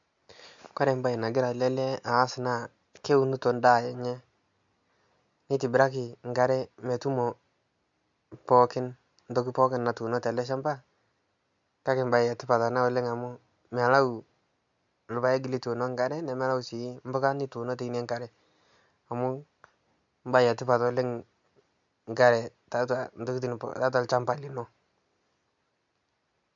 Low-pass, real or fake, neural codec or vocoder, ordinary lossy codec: 7.2 kHz; real; none; MP3, 64 kbps